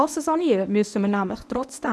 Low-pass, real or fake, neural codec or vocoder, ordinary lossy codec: none; fake; codec, 24 kHz, 0.9 kbps, WavTokenizer, medium speech release version 1; none